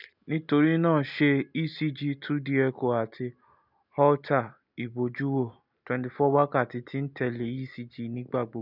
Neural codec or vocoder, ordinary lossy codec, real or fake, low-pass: none; none; real; 5.4 kHz